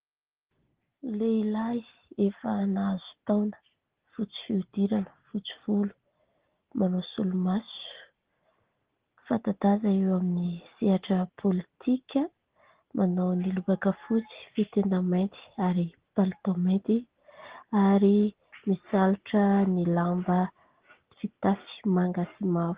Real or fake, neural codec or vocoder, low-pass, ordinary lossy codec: real; none; 3.6 kHz; Opus, 16 kbps